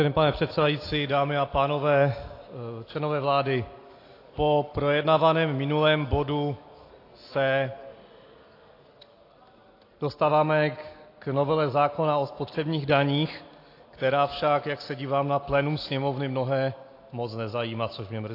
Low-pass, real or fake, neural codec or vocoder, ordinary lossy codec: 5.4 kHz; real; none; AAC, 32 kbps